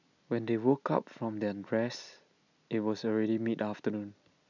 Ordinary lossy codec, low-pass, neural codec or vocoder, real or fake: none; 7.2 kHz; none; real